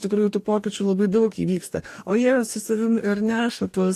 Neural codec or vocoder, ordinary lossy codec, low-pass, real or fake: codec, 44.1 kHz, 2.6 kbps, DAC; AAC, 64 kbps; 14.4 kHz; fake